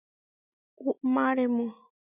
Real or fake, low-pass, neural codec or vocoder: real; 3.6 kHz; none